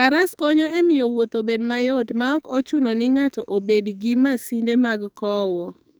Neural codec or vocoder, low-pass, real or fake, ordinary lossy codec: codec, 44.1 kHz, 2.6 kbps, SNAC; none; fake; none